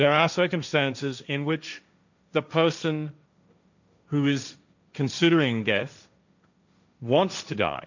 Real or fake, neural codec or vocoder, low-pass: fake; codec, 16 kHz, 1.1 kbps, Voila-Tokenizer; 7.2 kHz